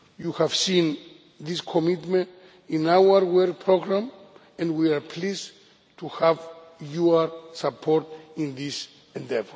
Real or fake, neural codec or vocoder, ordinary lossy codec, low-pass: real; none; none; none